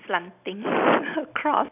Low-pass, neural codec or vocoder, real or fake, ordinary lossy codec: 3.6 kHz; none; real; none